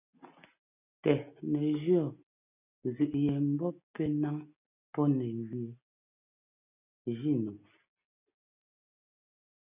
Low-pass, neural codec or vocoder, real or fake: 3.6 kHz; none; real